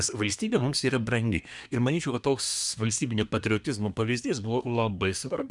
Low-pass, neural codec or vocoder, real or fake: 10.8 kHz; codec, 24 kHz, 1 kbps, SNAC; fake